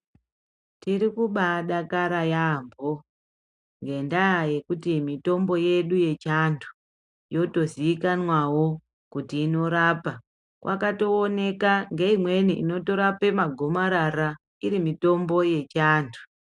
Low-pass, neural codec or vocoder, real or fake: 10.8 kHz; none; real